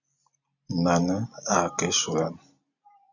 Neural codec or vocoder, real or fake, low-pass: none; real; 7.2 kHz